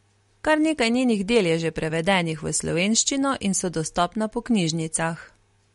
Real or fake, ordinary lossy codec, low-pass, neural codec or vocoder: real; MP3, 48 kbps; 19.8 kHz; none